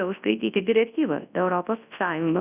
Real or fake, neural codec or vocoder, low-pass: fake; codec, 24 kHz, 0.9 kbps, WavTokenizer, large speech release; 3.6 kHz